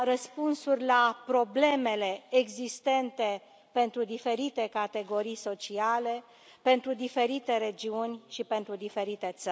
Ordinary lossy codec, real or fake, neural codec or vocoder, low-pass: none; real; none; none